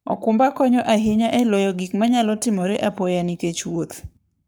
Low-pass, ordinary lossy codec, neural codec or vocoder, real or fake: none; none; codec, 44.1 kHz, 7.8 kbps, Pupu-Codec; fake